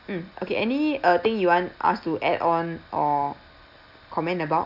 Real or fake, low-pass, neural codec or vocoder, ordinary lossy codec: real; 5.4 kHz; none; none